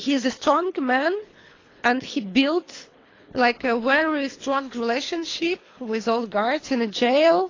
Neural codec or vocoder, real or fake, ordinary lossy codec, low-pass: codec, 24 kHz, 3 kbps, HILCodec; fake; AAC, 32 kbps; 7.2 kHz